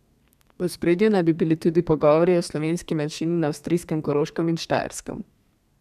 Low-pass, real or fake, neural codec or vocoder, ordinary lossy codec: 14.4 kHz; fake; codec, 32 kHz, 1.9 kbps, SNAC; none